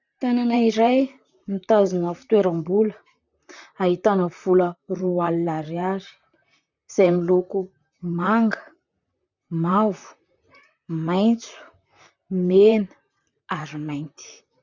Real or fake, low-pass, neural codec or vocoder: fake; 7.2 kHz; vocoder, 44.1 kHz, 128 mel bands, Pupu-Vocoder